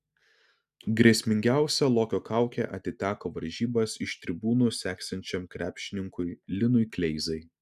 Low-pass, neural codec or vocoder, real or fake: 14.4 kHz; none; real